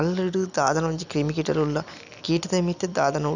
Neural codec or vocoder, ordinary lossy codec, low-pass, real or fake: none; none; 7.2 kHz; real